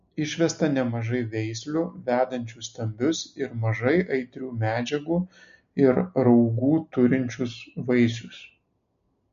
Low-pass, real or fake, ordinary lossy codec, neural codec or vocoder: 7.2 kHz; real; MP3, 48 kbps; none